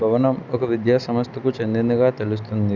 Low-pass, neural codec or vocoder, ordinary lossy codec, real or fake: 7.2 kHz; none; none; real